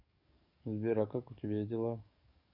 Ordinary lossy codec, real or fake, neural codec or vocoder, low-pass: none; fake; codec, 44.1 kHz, 7.8 kbps, DAC; 5.4 kHz